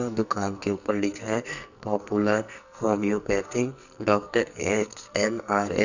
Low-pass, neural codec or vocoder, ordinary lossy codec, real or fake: 7.2 kHz; codec, 44.1 kHz, 2.6 kbps, SNAC; none; fake